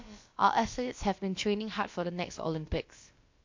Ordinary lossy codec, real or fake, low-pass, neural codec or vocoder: MP3, 48 kbps; fake; 7.2 kHz; codec, 16 kHz, about 1 kbps, DyCAST, with the encoder's durations